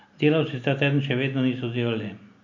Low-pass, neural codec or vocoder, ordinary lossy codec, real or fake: 7.2 kHz; none; none; real